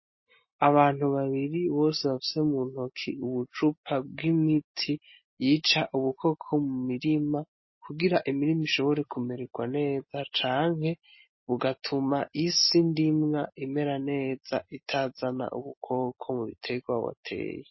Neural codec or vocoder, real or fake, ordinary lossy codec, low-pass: none; real; MP3, 24 kbps; 7.2 kHz